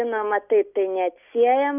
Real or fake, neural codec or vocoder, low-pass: real; none; 3.6 kHz